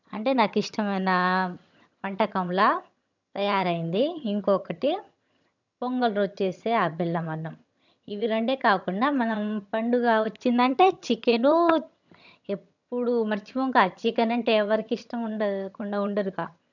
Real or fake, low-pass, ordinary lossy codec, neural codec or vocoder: fake; 7.2 kHz; none; vocoder, 22.05 kHz, 80 mel bands, HiFi-GAN